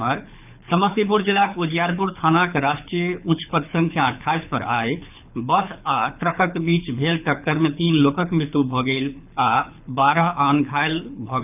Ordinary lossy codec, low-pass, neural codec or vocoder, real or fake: none; 3.6 kHz; codec, 24 kHz, 6 kbps, HILCodec; fake